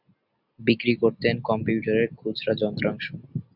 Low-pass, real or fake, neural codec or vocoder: 5.4 kHz; real; none